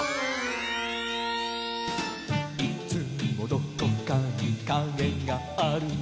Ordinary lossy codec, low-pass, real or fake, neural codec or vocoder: none; none; real; none